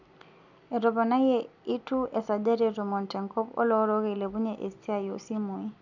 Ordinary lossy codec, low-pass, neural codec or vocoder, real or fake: none; 7.2 kHz; none; real